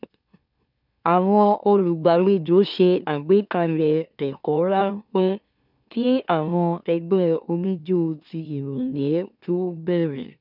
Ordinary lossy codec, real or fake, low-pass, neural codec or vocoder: none; fake; 5.4 kHz; autoencoder, 44.1 kHz, a latent of 192 numbers a frame, MeloTTS